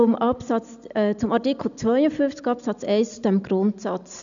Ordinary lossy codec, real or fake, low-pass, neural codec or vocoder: none; real; 7.2 kHz; none